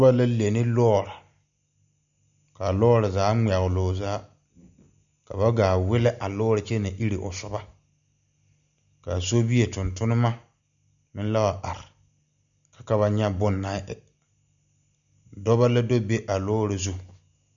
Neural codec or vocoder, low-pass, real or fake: none; 7.2 kHz; real